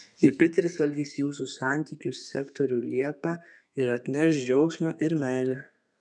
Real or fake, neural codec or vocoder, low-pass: fake; codec, 32 kHz, 1.9 kbps, SNAC; 10.8 kHz